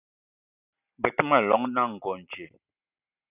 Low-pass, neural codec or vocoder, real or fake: 3.6 kHz; none; real